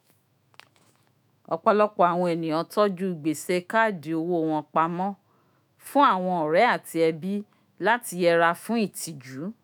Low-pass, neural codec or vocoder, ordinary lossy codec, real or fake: none; autoencoder, 48 kHz, 128 numbers a frame, DAC-VAE, trained on Japanese speech; none; fake